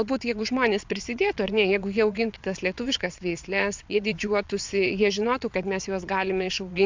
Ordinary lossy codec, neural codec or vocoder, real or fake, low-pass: MP3, 64 kbps; vocoder, 22.05 kHz, 80 mel bands, WaveNeXt; fake; 7.2 kHz